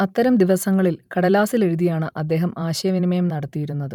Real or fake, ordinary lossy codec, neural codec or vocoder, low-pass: fake; none; vocoder, 44.1 kHz, 128 mel bands every 512 samples, BigVGAN v2; 19.8 kHz